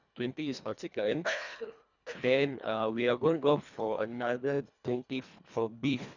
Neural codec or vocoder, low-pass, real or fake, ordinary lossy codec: codec, 24 kHz, 1.5 kbps, HILCodec; 7.2 kHz; fake; none